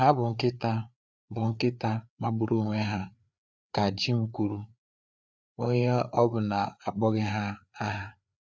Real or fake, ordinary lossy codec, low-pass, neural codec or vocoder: fake; none; none; codec, 16 kHz, 4 kbps, FreqCodec, larger model